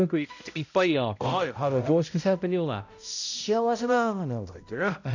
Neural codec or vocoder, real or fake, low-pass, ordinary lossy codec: codec, 16 kHz, 0.5 kbps, X-Codec, HuBERT features, trained on balanced general audio; fake; 7.2 kHz; none